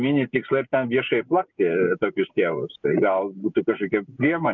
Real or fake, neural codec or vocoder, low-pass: real; none; 7.2 kHz